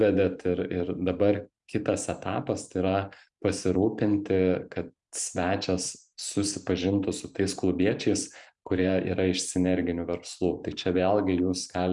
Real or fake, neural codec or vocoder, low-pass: fake; vocoder, 44.1 kHz, 128 mel bands every 512 samples, BigVGAN v2; 10.8 kHz